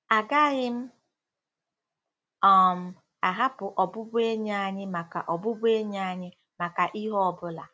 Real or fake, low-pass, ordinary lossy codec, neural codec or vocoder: real; none; none; none